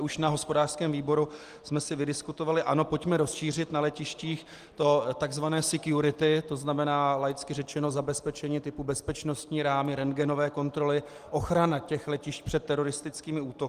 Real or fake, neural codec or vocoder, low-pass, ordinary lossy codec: real; none; 14.4 kHz; Opus, 24 kbps